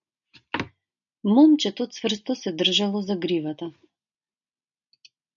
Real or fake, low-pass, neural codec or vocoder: real; 7.2 kHz; none